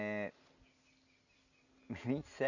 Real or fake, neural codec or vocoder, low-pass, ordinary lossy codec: real; none; 7.2 kHz; none